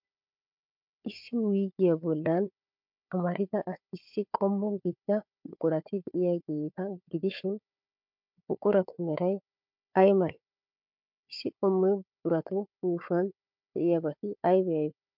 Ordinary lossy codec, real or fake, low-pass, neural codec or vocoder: MP3, 48 kbps; fake; 5.4 kHz; codec, 16 kHz, 4 kbps, FunCodec, trained on Chinese and English, 50 frames a second